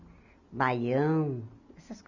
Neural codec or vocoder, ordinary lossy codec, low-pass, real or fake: none; none; 7.2 kHz; real